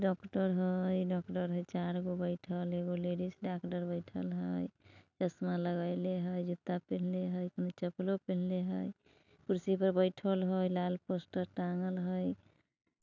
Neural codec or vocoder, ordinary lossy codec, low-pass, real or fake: none; none; 7.2 kHz; real